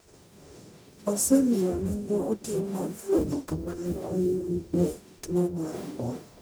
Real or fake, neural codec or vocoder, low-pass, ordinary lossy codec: fake; codec, 44.1 kHz, 0.9 kbps, DAC; none; none